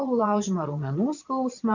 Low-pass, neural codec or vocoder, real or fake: 7.2 kHz; vocoder, 22.05 kHz, 80 mel bands, Vocos; fake